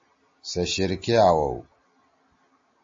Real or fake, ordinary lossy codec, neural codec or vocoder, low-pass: real; MP3, 32 kbps; none; 7.2 kHz